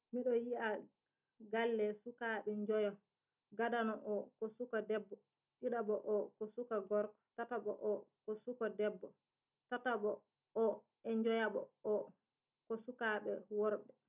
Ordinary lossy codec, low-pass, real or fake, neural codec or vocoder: none; 3.6 kHz; real; none